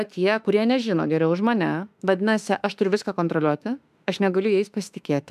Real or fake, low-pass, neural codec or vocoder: fake; 14.4 kHz; autoencoder, 48 kHz, 32 numbers a frame, DAC-VAE, trained on Japanese speech